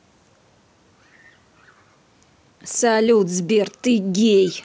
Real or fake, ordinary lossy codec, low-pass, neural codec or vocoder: real; none; none; none